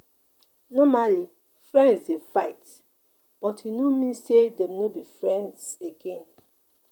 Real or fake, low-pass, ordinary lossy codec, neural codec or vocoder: fake; 19.8 kHz; none; vocoder, 44.1 kHz, 128 mel bands, Pupu-Vocoder